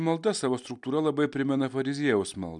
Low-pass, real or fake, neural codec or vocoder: 10.8 kHz; real; none